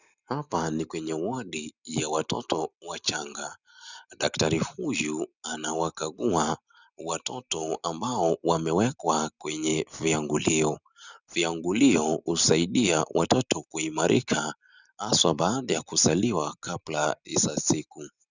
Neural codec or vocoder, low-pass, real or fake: none; 7.2 kHz; real